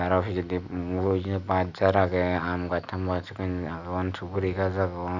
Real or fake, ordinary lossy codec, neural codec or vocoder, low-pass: real; none; none; 7.2 kHz